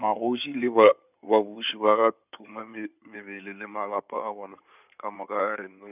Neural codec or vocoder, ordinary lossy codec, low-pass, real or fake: codec, 16 kHz in and 24 kHz out, 2.2 kbps, FireRedTTS-2 codec; none; 3.6 kHz; fake